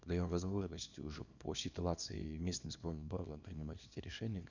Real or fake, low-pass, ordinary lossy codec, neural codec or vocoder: fake; 7.2 kHz; none; codec, 24 kHz, 0.9 kbps, WavTokenizer, small release